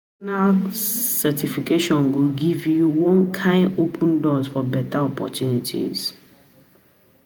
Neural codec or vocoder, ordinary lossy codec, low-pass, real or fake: vocoder, 48 kHz, 128 mel bands, Vocos; none; none; fake